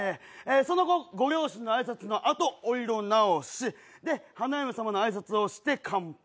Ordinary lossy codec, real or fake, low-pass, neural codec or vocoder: none; real; none; none